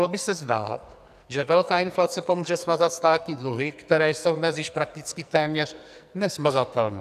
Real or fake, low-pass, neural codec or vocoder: fake; 14.4 kHz; codec, 44.1 kHz, 2.6 kbps, SNAC